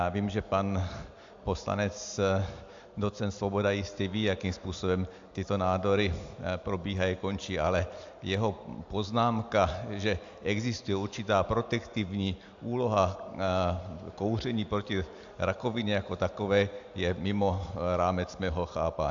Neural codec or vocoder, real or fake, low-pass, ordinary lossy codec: none; real; 7.2 kHz; AAC, 64 kbps